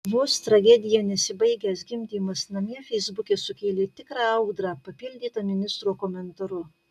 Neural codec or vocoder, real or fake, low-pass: none; real; 14.4 kHz